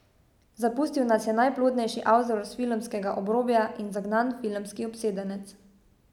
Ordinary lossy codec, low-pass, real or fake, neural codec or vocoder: none; 19.8 kHz; real; none